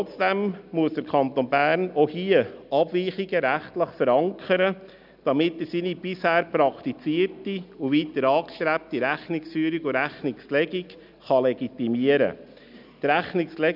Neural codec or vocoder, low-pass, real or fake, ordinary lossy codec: none; 5.4 kHz; real; none